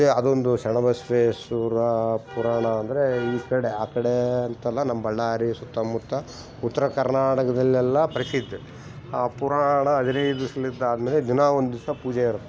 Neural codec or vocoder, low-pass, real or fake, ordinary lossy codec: none; none; real; none